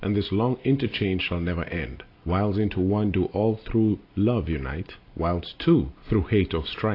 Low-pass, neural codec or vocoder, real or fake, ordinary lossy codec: 5.4 kHz; none; real; AAC, 32 kbps